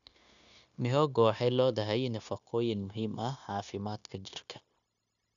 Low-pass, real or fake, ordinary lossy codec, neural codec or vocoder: 7.2 kHz; fake; none; codec, 16 kHz, 0.9 kbps, LongCat-Audio-Codec